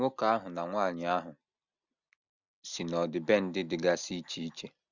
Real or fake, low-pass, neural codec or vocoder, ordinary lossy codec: real; 7.2 kHz; none; none